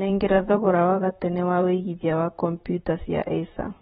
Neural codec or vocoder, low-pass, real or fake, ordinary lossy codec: vocoder, 44.1 kHz, 128 mel bands, Pupu-Vocoder; 19.8 kHz; fake; AAC, 16 kbps